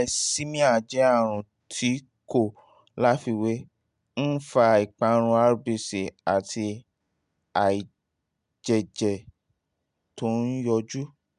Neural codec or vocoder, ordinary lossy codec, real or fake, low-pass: none; MP3, 96 kbps; real; 10.8 kHz